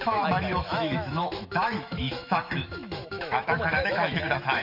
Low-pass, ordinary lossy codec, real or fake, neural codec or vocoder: 5.4 kHz; none; fake; autoencoder, 48 kHz, 128 numbers a frame, DAC-VAE, trained on Japanese speech